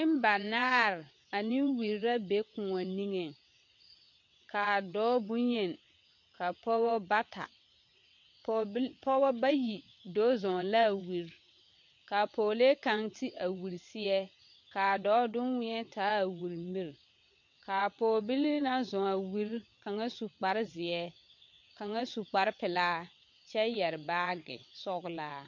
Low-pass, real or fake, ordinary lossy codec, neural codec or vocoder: 7.2 kHz; fake; MP3, 48 kbps; vocoder, 22.05 kHz, 80 mel bands, WaveNeXt